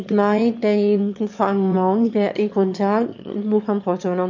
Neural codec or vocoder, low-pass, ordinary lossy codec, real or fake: autoencoder, 22.05 kHz, a latent of 192 numbers a frame, VITS, trained on one speaker; 7.2 kHz; MP3, 48 kbps; fake